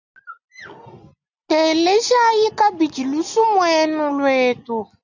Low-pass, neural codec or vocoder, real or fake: 7.2 kHz; none; real